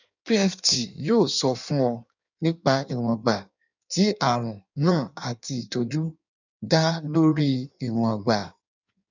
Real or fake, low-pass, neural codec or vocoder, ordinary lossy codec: fake; 7.2 kHz; codec, 16 kHz in and 24 kHz out, 1.1 kbps, FireRedTTS-2 codec; none